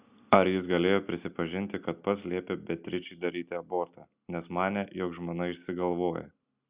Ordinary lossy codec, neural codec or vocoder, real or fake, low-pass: Opus, 64 kbps; none; real; 3.6 kHz